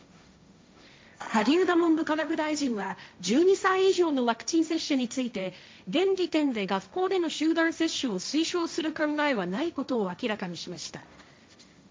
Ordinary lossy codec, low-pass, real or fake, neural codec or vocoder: none; none; fake; codec, 16 kHz, 1.1 kbps, Voila-Tokenizer